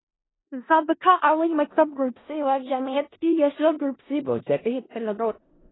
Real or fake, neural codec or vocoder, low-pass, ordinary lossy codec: fake; codec, 16 kHz in and 24 kHz out, 0.4 kbps, LongCat-Audio-Codec, four codebook decoder; 7.2 kHz; AAC, 16 kbps